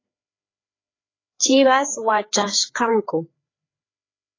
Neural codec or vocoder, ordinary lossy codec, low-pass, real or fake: codec, 16 kHz, 4 kbps, FreqCodec, larger model; AAC, 32 kbps; 7.2 kHz; fake